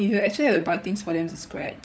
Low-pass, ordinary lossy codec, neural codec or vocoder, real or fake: none; none; codec, 16 kHz, 16 kbps, FunCodec, trained on LibriTTS, 50 frames a second; fake